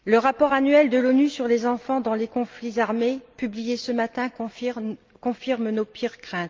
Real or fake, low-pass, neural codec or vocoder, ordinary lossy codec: real; 7.2 kHz; none; Opus, 24 kbps